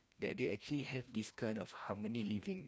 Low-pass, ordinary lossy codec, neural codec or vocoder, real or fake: none; none; codec, 16 kHz, 1 kbps, FreqCodec, larger model; fake